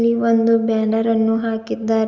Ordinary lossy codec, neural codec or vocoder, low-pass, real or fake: Opus, 32 kbps; none; 7.2 kHz; real